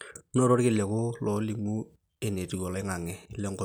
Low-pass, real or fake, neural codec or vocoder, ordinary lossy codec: none; real; none; none